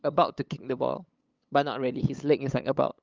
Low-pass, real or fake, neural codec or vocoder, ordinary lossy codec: 7.2 kHz; fake; codec, 16 kHz, 8 kbps, FunCodec, trained on LibriTTS, 25 frames a second; Opus, 24 kbps